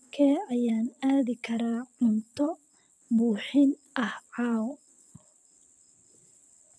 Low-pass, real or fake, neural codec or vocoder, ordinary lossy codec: none; fake; vocoder, 22.05 kHz, 80 mel bands, WaveNeXt; none